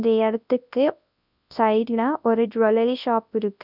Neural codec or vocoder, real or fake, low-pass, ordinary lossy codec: codec, 24 kHz, 0.9 kbps, WavTokenizer, large speech release; fake; 5.4 kHz; none